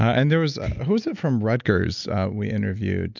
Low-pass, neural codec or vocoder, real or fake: 7.2 kHz; none; real